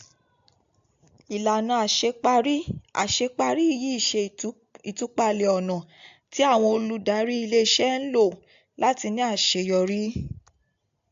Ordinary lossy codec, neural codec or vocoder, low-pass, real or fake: AAC, 64 kbps; none; 7.2 kHz; real